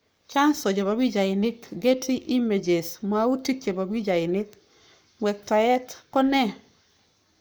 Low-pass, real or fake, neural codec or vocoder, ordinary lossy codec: none; fake; codec, 44.1 kHz, 7.8 kbps, Pupu-Codec; none